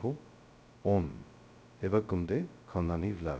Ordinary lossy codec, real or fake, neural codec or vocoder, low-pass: none; fake; codec, 16 kHz, 0.2 kbps, FocalCodec; none